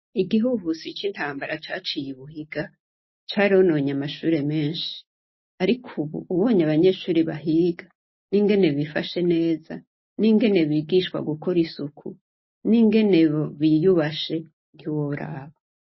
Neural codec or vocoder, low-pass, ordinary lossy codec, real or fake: none; 7.2 kHz; MP3, 24 kbps; real